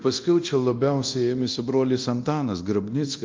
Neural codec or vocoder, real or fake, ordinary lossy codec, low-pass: codec, 24 kHz, 0.9 kbps, DualCodec; fake; Opus, 32 kbps; 7.2 kHz